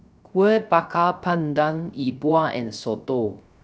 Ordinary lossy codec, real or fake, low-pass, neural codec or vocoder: none; fake; none; codec, 16 kHz, 0.3 kbps, FocalCodec